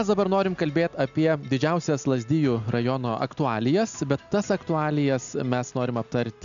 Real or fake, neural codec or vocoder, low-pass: real; none; 7.2 kHz